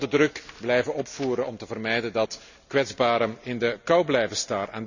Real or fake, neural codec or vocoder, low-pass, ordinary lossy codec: real; none; 7.2 kHz; none